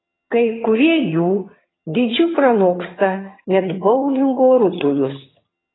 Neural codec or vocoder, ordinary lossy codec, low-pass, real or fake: vocoder, 22.05 kHz, 80 mel bands, HiFi-GAN; AAC, 16 kbps; 7.2 kHz; fake